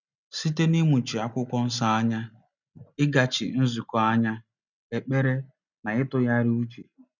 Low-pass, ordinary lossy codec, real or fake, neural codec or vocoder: 7.2 kHz; none; real; none